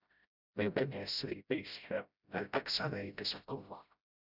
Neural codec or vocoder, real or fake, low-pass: codec, 16 kHz, 0.5 kbps, FreqCodec, smaller model; fake; 5.4 kHz